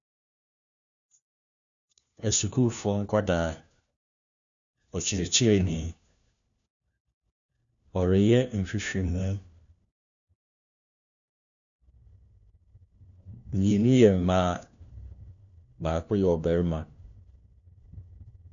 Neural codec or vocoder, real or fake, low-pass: codec, 16 kHz, 1 kbps, FunCodec, trained on LibriTTS, 50 frames a second; fake; 7.2 kHz